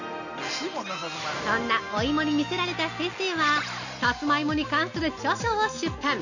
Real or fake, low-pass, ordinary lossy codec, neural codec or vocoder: real; 7.2 kHz; none; none